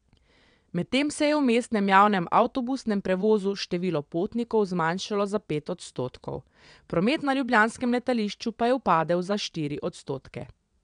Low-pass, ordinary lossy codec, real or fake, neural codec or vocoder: 9.9 kHz; none; fake; vocoder, 22.05 kHz, 80 mel bands, WaveNeXt